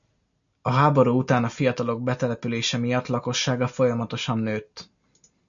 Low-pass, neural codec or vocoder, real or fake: 7.2 kHz; none; real